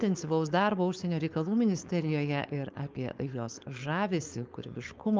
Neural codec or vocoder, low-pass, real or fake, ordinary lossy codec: codec, 16 kHz, 4.8 kbps, FACodec; 7.2 kHz; fake; Opus, 24 kbps